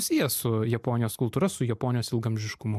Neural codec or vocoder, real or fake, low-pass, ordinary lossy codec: none; real; 14.4 kHz; MP3, 96 kbps